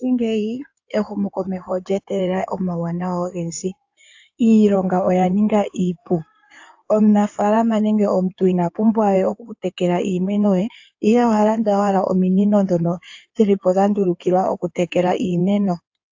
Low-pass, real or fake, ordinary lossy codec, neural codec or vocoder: 7.2 kHz; fake; AAC, 48 kbps; codec, 16 kHz in and 24 kHz out, 2.2 kbps, FireRedTTS-2 codec